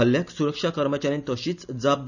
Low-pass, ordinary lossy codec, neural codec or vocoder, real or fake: 7.2 kHz; none; none; real